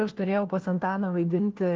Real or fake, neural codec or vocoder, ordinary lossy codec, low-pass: fake; codec, 16 kHz, 1 kbps, FunCodec, trained on LibriTTS, 50 frames a second; Opus, 16 kbps; 7.2 kHz